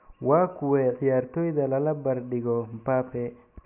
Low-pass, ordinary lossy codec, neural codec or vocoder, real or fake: 3.6 kHz; none; none; real